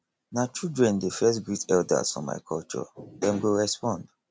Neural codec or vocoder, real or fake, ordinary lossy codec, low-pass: none; real; none; none